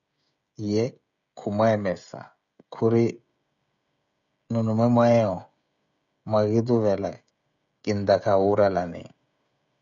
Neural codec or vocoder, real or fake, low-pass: codec, 16 kHz, 16 kbps, FreqCodec, smaller model; fake; 7.2 kHz